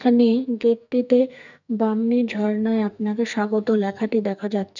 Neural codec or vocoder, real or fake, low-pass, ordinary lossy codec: codec, 44.1 kHz, 2.6 kbps, SNAC; fake; 7.2 kHz; none